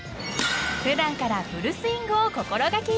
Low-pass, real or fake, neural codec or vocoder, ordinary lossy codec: none; real; none; none